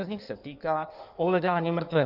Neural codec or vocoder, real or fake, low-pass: codec, 16 kHz in and 24 kHz out, 1.1 kbps, FireRedTTS-2 codec; fake; 5.4 kHz